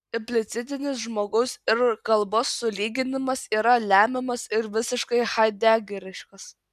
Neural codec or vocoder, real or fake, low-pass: none; real; 14.4 kHz